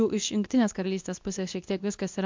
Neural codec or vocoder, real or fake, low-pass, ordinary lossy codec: codec, 24 kHz, 0.9 kbps, WavTokenizer, medium speech release version 2; fake; 7.2 kHz; MP3, 64 kbps